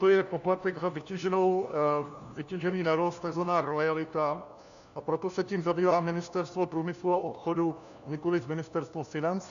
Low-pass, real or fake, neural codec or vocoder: 7.2 kHz; fake; codec, 16 kHz, 1 kbps, FunCodec, trained on LibriTTS, 50 frames a second